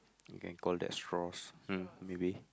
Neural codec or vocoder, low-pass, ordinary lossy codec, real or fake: none; none; none; real